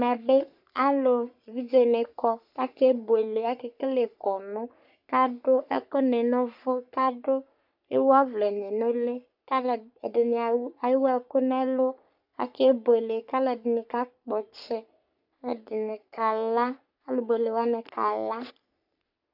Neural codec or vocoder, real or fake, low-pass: codec, 44.1 kHz, 3.4 kbps, Pupu-Codec; fake; 5.4 kHz